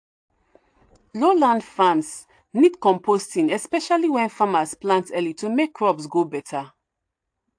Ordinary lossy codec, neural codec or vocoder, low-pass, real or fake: AAC, 64 kbps; none; 9.9 kHz; real